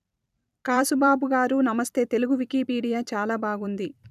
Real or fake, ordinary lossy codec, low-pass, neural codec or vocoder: fake; none; 14.4 kHz; vocoder, 44.1 kHz, 128 mel bands every 256 samples, BigVGAN v2